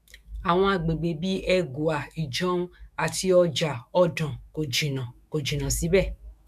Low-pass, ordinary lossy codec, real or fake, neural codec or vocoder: 14.4 kHz; none; fake; autoencoder, 48 kHz, 128 numbers a frame, DAC-VAE, trained on Japanese speech